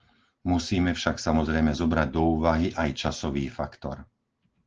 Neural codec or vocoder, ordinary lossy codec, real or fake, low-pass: none; Opus, 16 kbps; real; 7.2 kHz